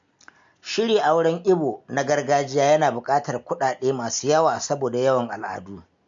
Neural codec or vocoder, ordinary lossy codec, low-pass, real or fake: none; MP3, 48 kbps; 7.2 kHz; real